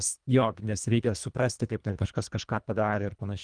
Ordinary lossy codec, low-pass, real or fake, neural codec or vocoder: Opus, 32 kbps; 9.9 kHz; fake; codec, 24 kHz, 1.5 kbps, HILCodec